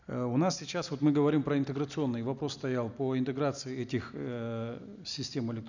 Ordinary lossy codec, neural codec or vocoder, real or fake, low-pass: none; none; real; 7.2 kHz